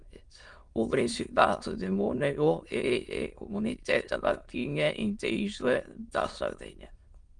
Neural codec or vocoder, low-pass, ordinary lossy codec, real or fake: autoencoder, 22.05 kHz, a latent of 192 numbers a frame, VITS, trained on many speakers; 9.9 kHz; Opus, 24 kbps; fake